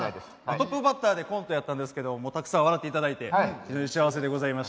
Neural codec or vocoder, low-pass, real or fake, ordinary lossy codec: none; none; real; none